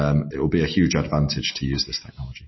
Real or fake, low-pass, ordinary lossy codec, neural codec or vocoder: real; 7.2 kHz; MP3, 24 kbps; none